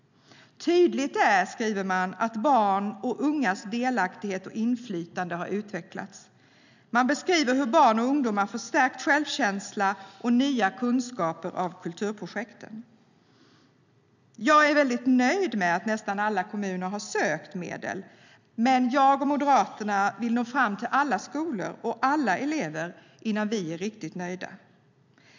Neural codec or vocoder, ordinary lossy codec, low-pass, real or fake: none; none; 7.2 kHz; real